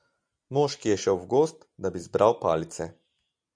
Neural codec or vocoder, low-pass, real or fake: none; 9.9 kHz; real